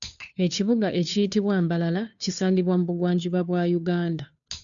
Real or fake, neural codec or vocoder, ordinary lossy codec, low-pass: fake; codec, 16 kHz, 2 kbps, FunCodec, trained on Chinese and English, 25 frames a second; none; 7.2 kHz